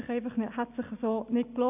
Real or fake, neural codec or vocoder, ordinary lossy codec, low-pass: real; none; AAC, 32 kbps; 3.6 kHz